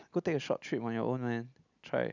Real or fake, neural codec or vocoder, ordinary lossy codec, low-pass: real; none; none; 7.2 kHz